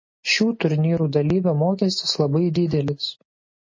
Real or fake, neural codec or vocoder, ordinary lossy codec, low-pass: real; none; MP3, 32 kbps; 7.2 kHz